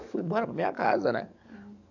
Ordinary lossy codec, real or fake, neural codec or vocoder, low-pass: none; fake; codec, 44.1 kHz, 7.8 kbps, DAC; 7.2 kHz